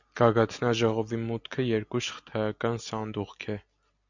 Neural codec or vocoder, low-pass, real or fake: none; 7.2 kHz; real